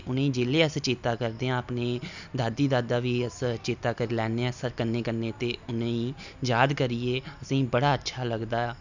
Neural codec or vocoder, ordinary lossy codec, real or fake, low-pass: none; none; real; 7.2 kHz